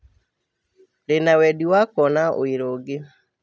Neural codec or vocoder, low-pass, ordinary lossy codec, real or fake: none; none; none; real